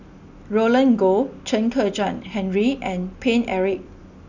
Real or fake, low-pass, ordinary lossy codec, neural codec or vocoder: real; 7.2 kHz; none; none